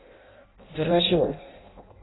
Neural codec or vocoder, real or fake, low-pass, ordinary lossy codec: codec, 16 kHz in and 24 kHz out, 0.6 kbps, FireRedTTS-2 codec; fake; 7.2 kHz; AAC, 16 kbps